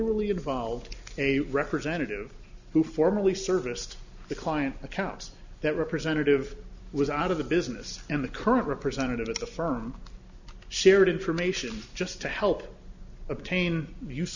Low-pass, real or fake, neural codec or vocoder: 7.2 kHz; real; none